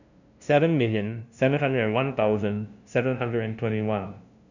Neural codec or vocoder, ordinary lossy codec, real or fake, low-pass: codec, 16 kHz, 0.5 kbps, FunCodec, trained on LibriTTS, 25 frames a second; none; fake; 7.2 kHz